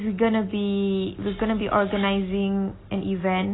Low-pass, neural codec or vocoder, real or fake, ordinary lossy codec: 7.2 kHz; none; real; AAC, 16 kbps